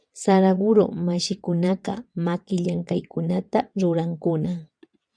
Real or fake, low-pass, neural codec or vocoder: fake; 9.9 kHz; vocoder, 22.05 kHz, 80 mel bands, WaveNeXt